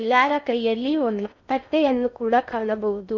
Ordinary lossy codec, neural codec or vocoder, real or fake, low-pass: none; codec, 16 kHz in and 24 kHz out, 0.6 kbps, FocalCodec, streaming, 4096 codes; fake; 7.2 kHz